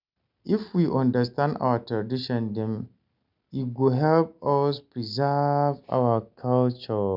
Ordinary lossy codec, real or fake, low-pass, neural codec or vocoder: none; real; 5.4 kHz; none